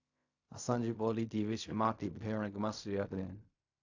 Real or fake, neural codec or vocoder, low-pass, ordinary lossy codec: fake; codec, 16 kHz in and 24 kHz out, 0.4 kbps, LongCat-Audio-Codec, fine tuned four codebook decoder; 7.2 kHz; AAC, 48 kbps